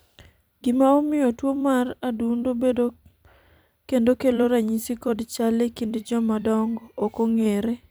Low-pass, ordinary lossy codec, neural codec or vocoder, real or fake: none; none; vocoder, 44.1 kHz, 128 mel bands every 256 samples, BigVGAN v2; fake